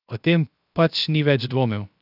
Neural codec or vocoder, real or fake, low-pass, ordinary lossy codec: codec, 16 kHz, 0.7 kbps, FocalCodec; fake; 5.4 kHz; none